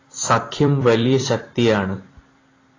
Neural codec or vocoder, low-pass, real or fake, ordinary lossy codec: vocoder, 44.1 kHz, 128 mel bands every 256 samples, BigVGAN v2; 7.2 kHz; fake; AAC, 32 kbps